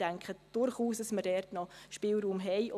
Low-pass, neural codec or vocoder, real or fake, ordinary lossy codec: 14.4 kHz; none; real; none